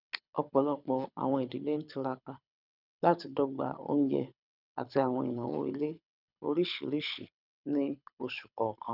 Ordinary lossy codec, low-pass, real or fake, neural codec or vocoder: none; 5.4 kHz; fake; codec, 24 kHz, 6 kbps, HILCodec